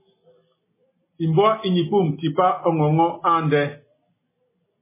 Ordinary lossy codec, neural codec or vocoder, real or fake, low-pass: MP3, 16 kbps; none; real; 3.6 kHz